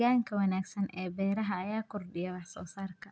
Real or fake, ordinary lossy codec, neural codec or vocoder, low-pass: real; none; none; none